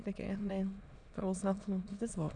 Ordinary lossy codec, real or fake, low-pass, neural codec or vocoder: none; fake; 9.9 kHz; autoencoder, 22.05 kHz, a latent of 192 numbers a frame, VITS, trained on many speakers